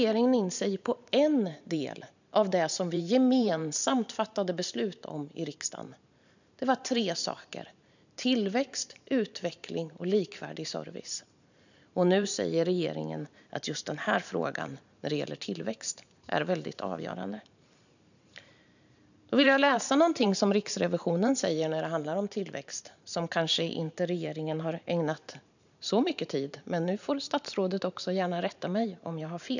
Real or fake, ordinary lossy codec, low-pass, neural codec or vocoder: fake; none; 7.2 kHz; vocoder, 44.1 kHz, 128 mel bands every 512 samples, BigVGAN v2